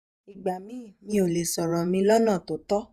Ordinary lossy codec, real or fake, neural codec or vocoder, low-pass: none; fake; vocoder, 48 kHz, 128 mel bands, Vocos; 14.4 kHz